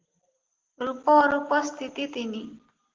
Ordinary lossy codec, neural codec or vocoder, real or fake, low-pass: Opus, 16 kbps; none; real; 7.2 kHz